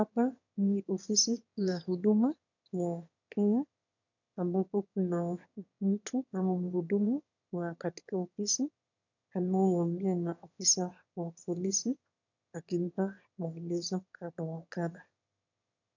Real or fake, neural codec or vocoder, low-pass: fake; autoencoder, 22.05 kHz, a latent of 192 numbers a frame, VITS, trained on one speaker; 7.2 kHz